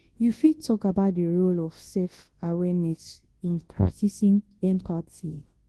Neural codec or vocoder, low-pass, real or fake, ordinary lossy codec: codec, 24 kHz, 0.9 kbps, WavTokenizer, large speech release; 10.8 kHz; fake; Opus, 16 kbps